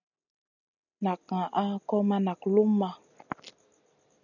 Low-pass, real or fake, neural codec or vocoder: 7.2 kHz; real; none